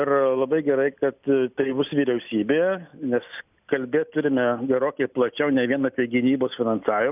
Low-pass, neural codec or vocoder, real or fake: 3.6 kHz; none; real